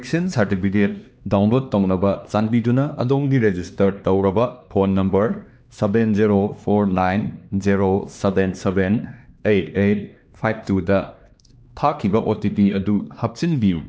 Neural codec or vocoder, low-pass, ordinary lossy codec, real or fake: codec, 16 kHz, 2 kbps, X-Codec, HuBERT features, trained on LibriSpeech; none; none; fake